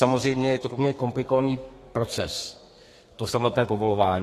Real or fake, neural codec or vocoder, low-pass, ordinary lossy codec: fake; codec, 32 kHz, 1.9 kbps, SNAC; 14.4 kHz; AAC, 48 kbps